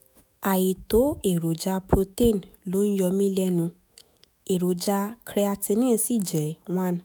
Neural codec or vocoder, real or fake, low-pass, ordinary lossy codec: autoencoder, 48 kHz, 128 numbers a frame, DAC-VAE, trained on Japanese speech; fake; none; none